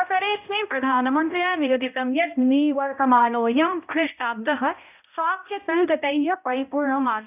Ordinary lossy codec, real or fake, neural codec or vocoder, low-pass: none; fake; codec, 16 kHz, 0.5 kbps, X-Codec, HuBERT features, trained on balanced general audio; 3.6 kHz